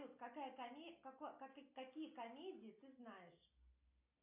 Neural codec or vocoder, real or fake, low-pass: none; real; 3.6 kHz